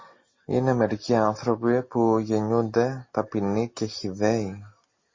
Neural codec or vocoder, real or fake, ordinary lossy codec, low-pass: none; real; MP3, 32 kbps; 7.2 kHz